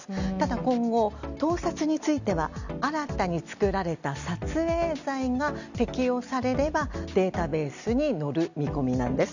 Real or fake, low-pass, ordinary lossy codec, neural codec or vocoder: real; 7.2 kHz; none; none